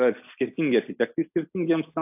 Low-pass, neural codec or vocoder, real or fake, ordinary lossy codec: 3.6 kHz; none; real; AAC, 32 kbps